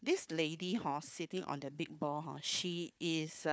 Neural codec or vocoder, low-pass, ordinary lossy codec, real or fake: codec, 16 kHz, 4 kbps, FunCodec, trained on Chinese and English, 50 frames a second; none; none; fake